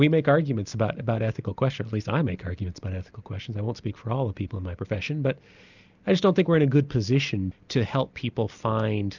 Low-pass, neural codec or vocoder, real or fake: 7.2 kHz; none; real